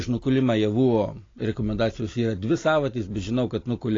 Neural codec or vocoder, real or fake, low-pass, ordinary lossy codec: none; real; 7.2 kHz; AAC, 32 kbps